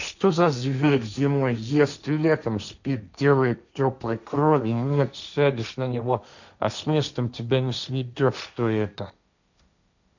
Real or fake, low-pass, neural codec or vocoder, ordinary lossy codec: fake; 7.2 kHz; codec, 16 kHz, 1.1 kbps, Voila-Tokenizer; none